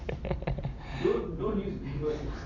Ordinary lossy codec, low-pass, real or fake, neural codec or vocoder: Opus, 64 kbps; 7.2 kHz; real; none